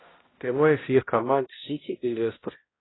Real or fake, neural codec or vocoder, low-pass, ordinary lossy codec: fake; codec, 16 kHz, 0.5 kbps, X-Codec, HuBERT features, trained on balanced general audio; 7.2 kHz; AAC, 16 kbps